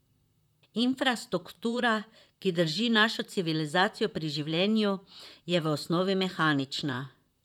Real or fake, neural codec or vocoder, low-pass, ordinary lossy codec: fake; vocoder, 44.1 kHz, 128 mel bands, Pupu-Vocoder; 19.8 kHz; none